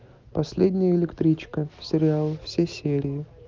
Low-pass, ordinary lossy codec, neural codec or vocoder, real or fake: 7.2 kHz; Opus, 32 kbps; none; real